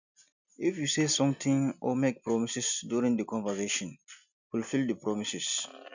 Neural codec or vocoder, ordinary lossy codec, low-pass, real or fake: none; none; 7.2 kHz; real